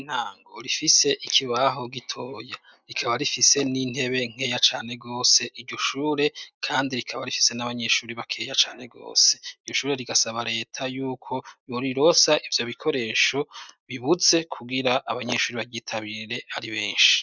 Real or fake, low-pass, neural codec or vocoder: real; 7.2 kHz; none